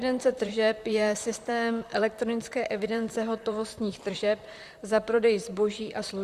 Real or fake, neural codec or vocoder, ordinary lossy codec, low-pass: fake; vocoder, 44.1 kHz, 128 mel bands, Pupu-Vocoder; Opus, 64 kbps; 14.4 kHz